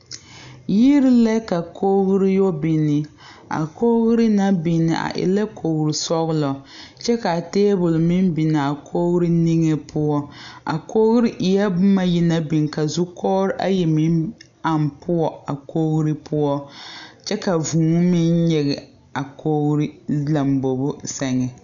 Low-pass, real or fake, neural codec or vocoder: 7.2 kHz; real; none